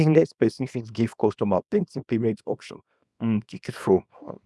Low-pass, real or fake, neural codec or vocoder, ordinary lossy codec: none; fake; codec, 24 kHz, 0.9 kbps, WavTokenizer, small release; none